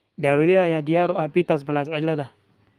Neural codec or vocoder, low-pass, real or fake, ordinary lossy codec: codec, 32 kHz, 1.9 kbps, SNAC; 14.4 kHz; fake; Opus, 32 kbps